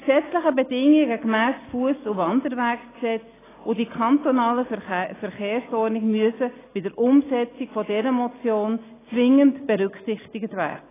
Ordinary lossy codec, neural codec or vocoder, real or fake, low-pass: AAC, 16 kbps; none; real; 3.6 kHz